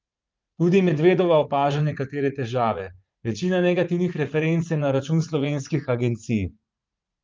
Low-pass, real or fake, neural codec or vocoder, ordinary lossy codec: 7.2 kHz; fake; vocoder, 44.1 kHz, 80 mel bands, Vocos; Opus, 32 kbps